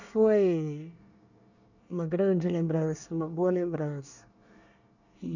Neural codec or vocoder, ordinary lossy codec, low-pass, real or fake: codec, 24 kHz, 1 kbps, SNAC; none; 7.2 kHz; fake